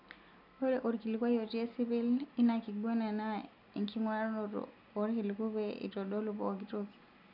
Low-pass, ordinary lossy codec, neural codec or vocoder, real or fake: 5.4 kHz; none; none; real